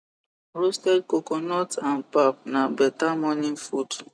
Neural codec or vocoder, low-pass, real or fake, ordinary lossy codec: none; none; real; none